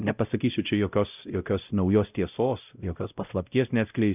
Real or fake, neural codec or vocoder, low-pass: fake; codec, 16 kHz, 0.5 kbps, X-Codec, WavLM features, trained on Multilingual LibriSpeech; 3.6 kHz